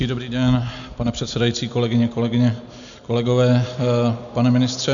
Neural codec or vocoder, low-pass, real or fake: none; 7.2 kHz; real